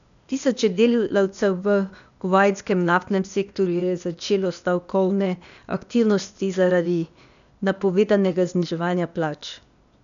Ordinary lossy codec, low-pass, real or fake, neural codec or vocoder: none; 7.2 kHz; fake; codec, 16 kHz, 0.8 kbps, ZipCodec